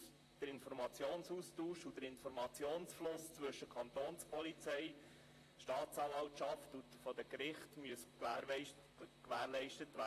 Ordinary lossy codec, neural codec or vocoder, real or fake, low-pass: AAC, 48 kbps; vocoder, 44.1 kHz, 128 mel bands, Pupu-Vocoder; fake; 14.4 kHz